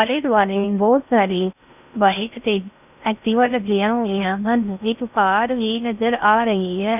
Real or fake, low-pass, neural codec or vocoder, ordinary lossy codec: fake; 3.6 kHz; codec, 16 kHz in and 24 kHz out, 0.6 kbps, FocalCodec, streaming, 4096 codes; none